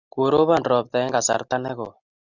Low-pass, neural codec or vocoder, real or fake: 7.2 kHz; none; real